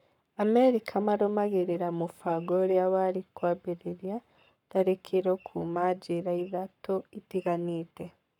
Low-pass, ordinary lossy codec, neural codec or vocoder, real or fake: 19.8 kHz; none; codec, 44.1 kHz, 7.8 kbps, Pupu-Codec; fake